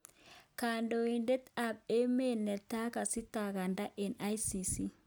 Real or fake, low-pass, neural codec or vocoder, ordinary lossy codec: real; none; none; none